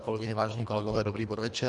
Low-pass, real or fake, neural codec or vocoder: 10.8 kHz; fake; codec, 24 kHz, 1.5 kbps, HILCodec